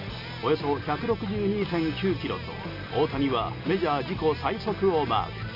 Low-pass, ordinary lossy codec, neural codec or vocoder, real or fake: 5.4 kHz; none; none; real